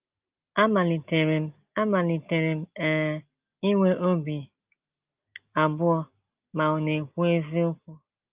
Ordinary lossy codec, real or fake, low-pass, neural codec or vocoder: Opus, 32 kbps; real; 3.6 kHz; none